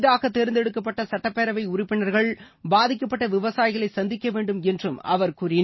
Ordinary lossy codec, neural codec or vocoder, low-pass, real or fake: MP3, 24 kbps; none; 7.2 kHz; real